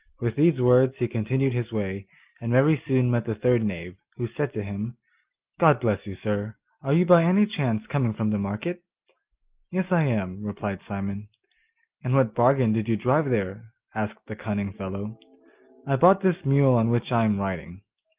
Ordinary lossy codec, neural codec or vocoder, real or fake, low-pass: Opus, 32 kbps; none; real; 3.6 kHz